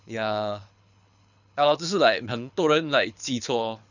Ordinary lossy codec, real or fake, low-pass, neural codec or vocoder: none; fake; 7.2 kHz; codec, 24 kHz, 6 kbps, HILCodec